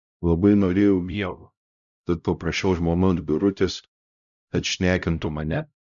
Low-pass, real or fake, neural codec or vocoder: 7.2 kHz; fake; codec, 16 kHz, 0.5 kbps, X-Codec, HuBERT features, trained on LibriSpeech